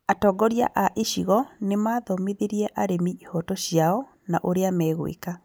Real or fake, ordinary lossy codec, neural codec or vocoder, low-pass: real; none; none; none